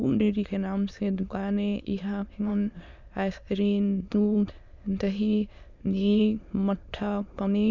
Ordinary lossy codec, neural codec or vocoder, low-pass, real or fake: none; autoencoder, 22.05 kHz, a latent of 192 numbers a frame, VITS, trained on many speakers; 7.2 kHz; fake